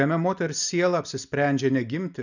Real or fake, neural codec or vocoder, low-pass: real; none; 7.2 kHz